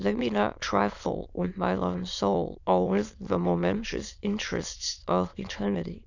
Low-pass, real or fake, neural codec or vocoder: 7.2 kHz; fake; autoencoder, 22.05 kHz, a latent of 192 numbers a frame, VITS, trained on many speakers